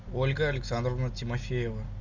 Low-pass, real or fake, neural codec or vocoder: 7.2 kHz; real; none